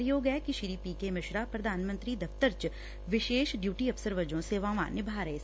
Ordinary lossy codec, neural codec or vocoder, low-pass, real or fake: none; none; none; real